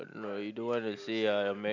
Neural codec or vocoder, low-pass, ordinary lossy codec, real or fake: none; 7.2 kHz; none; real